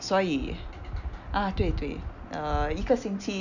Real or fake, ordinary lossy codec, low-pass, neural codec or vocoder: real; none; 7.2 kHz; none